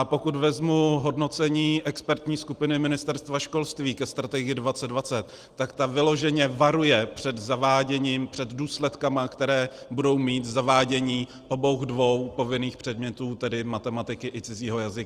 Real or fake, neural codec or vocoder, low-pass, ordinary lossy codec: real; none; 14.4 kHz; Opus, 24 kbps